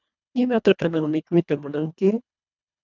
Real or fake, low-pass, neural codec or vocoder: fake; 7.2 kHz; codec, 24 kHz, 1.5 kbps, HILCodec